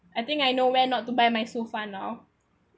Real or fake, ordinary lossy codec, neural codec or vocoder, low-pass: real; none; none; none